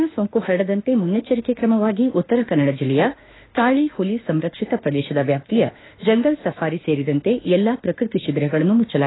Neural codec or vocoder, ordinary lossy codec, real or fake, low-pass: autoencoder, 48 kHz, 32 numbers a frame, DAC-VAE, trained on Japanese speech; AAC, 16 kbps; fake; 7.2 kHz